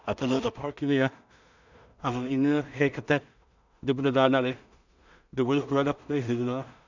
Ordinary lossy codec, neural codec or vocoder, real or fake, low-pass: none; codec, 16 kHz in and 24 kHz out, 0.4 kbps, LongCat-Audio-Codec, two codebook decoder; fake; 7.2 kHz